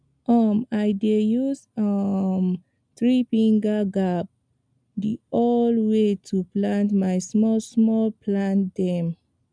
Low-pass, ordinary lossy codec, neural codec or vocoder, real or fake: 9.9 kHz; none; none; real